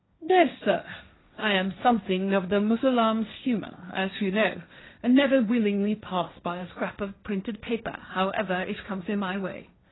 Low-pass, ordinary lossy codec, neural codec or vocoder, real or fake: 7.2 kHz; AAC, 16 kbps; codec, 16 kHz, 1.1 kbps, Voila-Tokenizer; fake